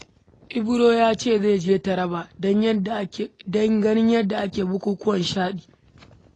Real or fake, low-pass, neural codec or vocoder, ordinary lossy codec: real; 9.9 kHz; none; AAC, 32 kbps